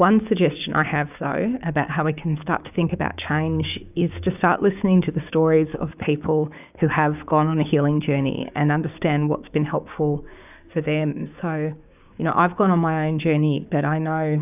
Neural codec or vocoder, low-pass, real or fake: codec, 16 kHz, 6 kbps, DAC; 3.6 kHz; fake